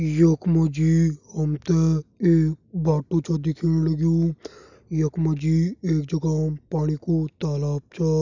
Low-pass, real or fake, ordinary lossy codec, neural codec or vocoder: 7.2 kHz; real; none; none